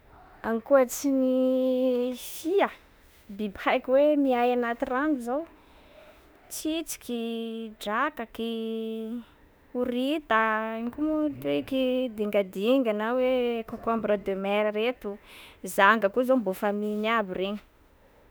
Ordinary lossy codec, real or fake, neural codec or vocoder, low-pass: none; fake; autoencoder, 48 kHz, 32 numbers a frame, DAC-VAE, trained on Japanese speech; none